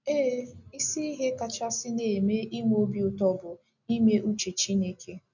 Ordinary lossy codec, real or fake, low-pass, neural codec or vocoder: none; real; 7.2 kHz; none